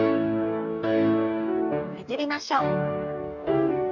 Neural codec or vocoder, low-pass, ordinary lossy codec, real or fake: codec, 44.1 kHz, 2.6 kbps, DAC; 7.2 kHz; none; fake